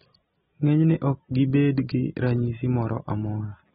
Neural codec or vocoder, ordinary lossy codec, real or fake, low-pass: none; AAC, 16 kbps; real; 19.8 kHz